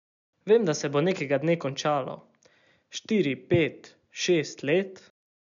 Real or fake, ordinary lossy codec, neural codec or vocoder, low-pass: real; MP3, 96 kbps; none; 7.2 kHz